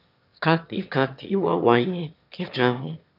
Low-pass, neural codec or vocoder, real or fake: 5.4 kHz; autoencoder, 22.05 kHz, a latent of 192 numbers a frame, VITS, trained on one speaker; fake